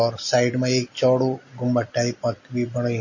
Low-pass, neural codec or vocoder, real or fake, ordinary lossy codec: 7.2 kHz; none; real; MP3, 32 kbps